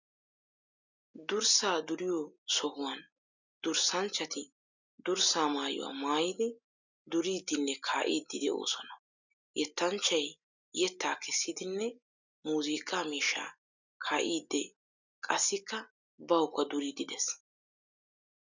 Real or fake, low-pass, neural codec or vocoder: real; 7.2 kHz; none